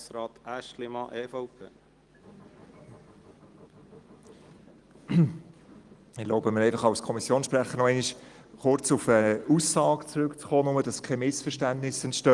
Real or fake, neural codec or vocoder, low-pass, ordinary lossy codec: real; none; 10.8 kHz; Opus, 24 kbps